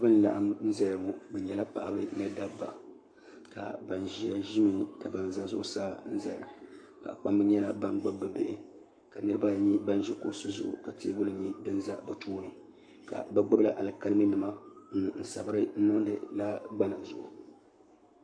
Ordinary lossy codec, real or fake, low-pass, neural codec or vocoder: AAC, 64 kbps; fake; 9.9 kHz; codec, 44.1 kHz, 7.8 kbps, Pupu-Codec